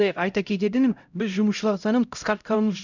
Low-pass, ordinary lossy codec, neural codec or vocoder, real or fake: 7.2 kHz; none; codec, 16 kHz, 0.5 kbps, X-Codec, HuBERT features, trained on LibriSpeech; fake